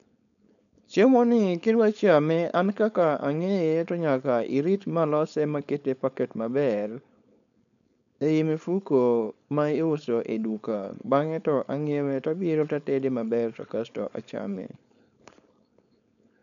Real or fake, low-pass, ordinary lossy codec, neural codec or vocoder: fake; 7.2 kHz; none; codec, 16 kHz, 4.8 kbps, FACodec